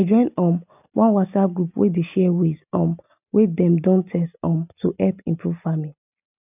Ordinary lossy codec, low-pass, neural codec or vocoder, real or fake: none; 3.6 kHz; none; real